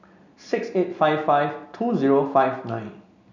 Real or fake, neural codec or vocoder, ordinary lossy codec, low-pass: real; none; none; 7.2 kHz